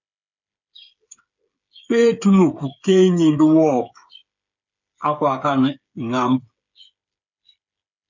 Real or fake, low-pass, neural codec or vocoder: fake; 7.2 kHz; codec, 16 kHz, 8 kbps, FreqCodec, smaller model